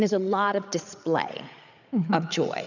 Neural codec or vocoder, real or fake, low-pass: codec, 16 kHz, 8 kbps, FreqCodec, larger model; fake; 7.2 kHz